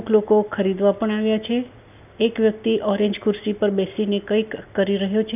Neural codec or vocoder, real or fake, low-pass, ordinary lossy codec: none; real; 3.6 kHz; none